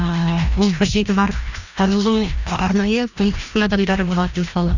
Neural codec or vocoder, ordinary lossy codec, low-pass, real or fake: codec, 16 kHz, 1 kbps, FreqCodec, larger model; none; 7.2 kHz; fake